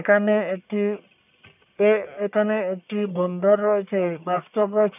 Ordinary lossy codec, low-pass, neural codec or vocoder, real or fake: none; 3.6 kHz; codec, 44.1 kHz, 1.7 kbps, Pupu-Codec; fake